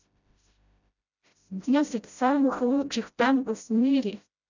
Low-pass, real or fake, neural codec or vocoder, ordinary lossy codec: 7.2 kHz; fake; codec, 16 kHz, 0.5 kbps, FreqCodec, smaller model; none